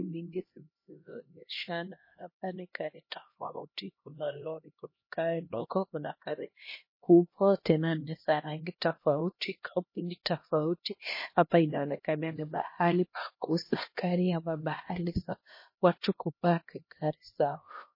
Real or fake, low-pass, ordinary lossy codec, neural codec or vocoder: fake; 5.4 kHz; MP3, 24 kbps; codec, 16 kHz, 1 kbps, X-Codec, HuBERT features, trained on LibriSpeech